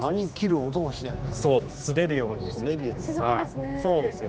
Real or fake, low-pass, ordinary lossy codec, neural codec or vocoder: fake; none; none; codec, 16 kHz, 2 kbps, X-Codec, HuBERT features, trained on general audio